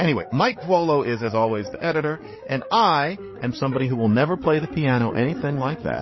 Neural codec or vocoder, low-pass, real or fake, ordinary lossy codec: codec, 16 kHz, 8 kbps, FreqCodec, larger model; 7.2 kHz; fake; MP3, 24 kbps